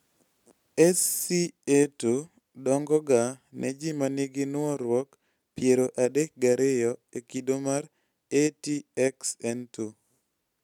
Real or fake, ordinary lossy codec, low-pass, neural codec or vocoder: real; none; 19.8 kHz; none